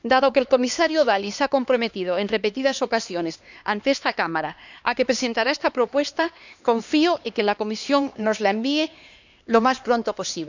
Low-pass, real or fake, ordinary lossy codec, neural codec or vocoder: 7.2 kHz; fake; none; codec, 16 kHz, 2 kbps, X-Codec, HuBERT features, trained on LibriSpeech